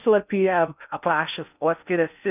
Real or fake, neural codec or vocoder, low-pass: fake; codec, 16 kHz in and 24 kHz out, 0.6 kbps, FocalCodec, streaming, 4096 codes; 3.6 kHz